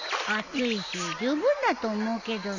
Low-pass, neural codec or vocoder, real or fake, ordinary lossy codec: 7.2 kHz; none; real; none